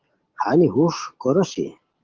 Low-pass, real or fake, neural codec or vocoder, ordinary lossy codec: 7.2 kHz; real; none; Opus, 16 kbps